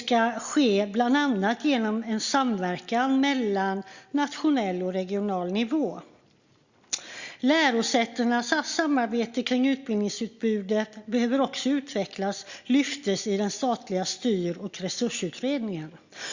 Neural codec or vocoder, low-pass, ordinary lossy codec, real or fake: none; 7.2 kHz; Opus, 64 kbps; real